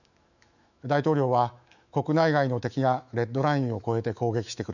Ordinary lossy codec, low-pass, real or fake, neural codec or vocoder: none; 7.2 kHz; fake; autoencoder, 48 kHz, 128 numbers a frame, DAC-VAE, trained on Japanese speech